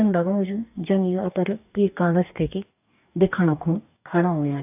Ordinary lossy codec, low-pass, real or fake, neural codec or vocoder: AAC, 32 kbps; 3.6 kHz; fake; codec, 44.1 kHz, 2.6 kbps, DAC